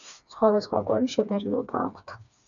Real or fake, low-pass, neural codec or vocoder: fake; 7.2 kHz; codec, 16 kHz, 2 kbps, FreqCodec, smaller model